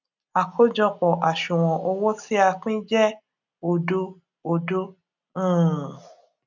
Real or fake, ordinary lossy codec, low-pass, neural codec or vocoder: real; none; 7.2 kHz; none